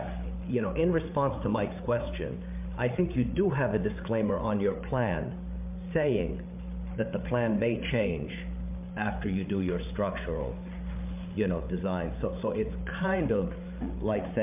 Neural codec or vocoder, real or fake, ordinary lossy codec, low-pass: codec, 16 kHz, 8 kbps, FreqCodec, larger model; fake; MP3, 32 kbps; 3.6 kHz